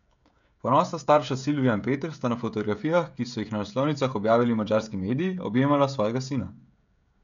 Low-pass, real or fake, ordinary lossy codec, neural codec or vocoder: 7.2 kHz; fake; none; codec, 16 kHz, 16 kbps, FreqCodec, smaller model